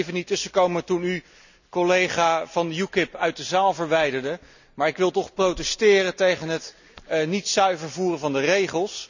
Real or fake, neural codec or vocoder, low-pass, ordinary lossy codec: real; none; 7.2 kHz; none